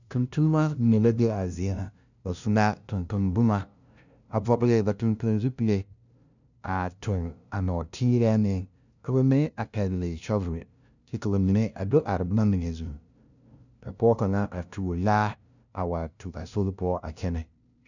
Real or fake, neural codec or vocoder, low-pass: fake; codec, 16 kHz, 0.5 kbps, FunCodec, trained on LibriTTS, 25 frames a second; 7.2 kHz